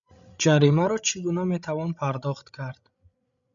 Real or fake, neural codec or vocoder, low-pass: fake; codec, 16 kHz, 16 kbps, FreqCodec, larger model; 7.2 kHz